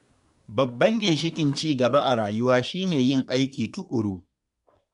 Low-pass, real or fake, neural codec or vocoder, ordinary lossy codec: 10.8 kHz; fake; codec, 24 kHz, 1 kbps, SNAC; none